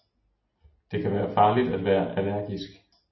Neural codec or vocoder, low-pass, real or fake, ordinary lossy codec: none; 7.2 kHz; real; MP3, 24 kbps